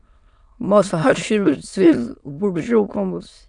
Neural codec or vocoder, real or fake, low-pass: autoencoder, 22.05 kHz, a latent of 192 numbers a frame, VITS, trained on many speakers; fake; 9.9 kHz